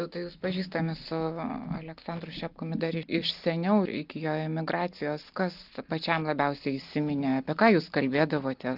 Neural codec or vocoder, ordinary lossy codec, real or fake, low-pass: none; Opus, 32 kbps; real; 5.4 kHz